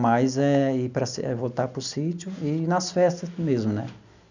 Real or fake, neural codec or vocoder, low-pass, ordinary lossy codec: real; none; 7.2 kHz; none